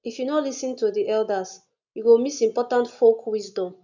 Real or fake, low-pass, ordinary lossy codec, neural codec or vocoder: real; 7.2 kHz; none; none